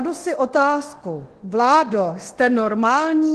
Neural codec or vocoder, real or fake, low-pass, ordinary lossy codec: codec, 24 kHz, 0.9 kbps, DualCodec; fake; 10.8 kHz; Opus, 16 kbps